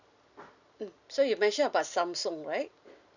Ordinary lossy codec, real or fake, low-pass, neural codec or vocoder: none; real; 7.2 kHz; none